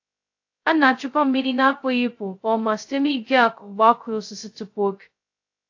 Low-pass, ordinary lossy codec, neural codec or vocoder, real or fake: 7.2 kHz; AAC, 48 kbps; codec, 16 kHz, 0.2 kbps, FocalCodec; fake